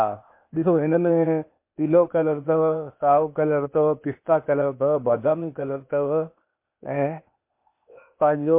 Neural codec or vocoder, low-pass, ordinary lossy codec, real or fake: codec, 16 kHz, 0.8 kbps, ZipCodec; 3.6 kHz; MP3, 24 kbps; fake